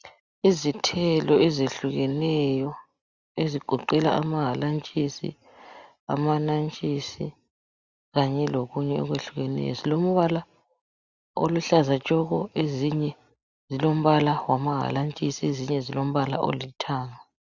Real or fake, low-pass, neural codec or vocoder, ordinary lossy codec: real; 7.2 kHz; none; Opus, 64 kbps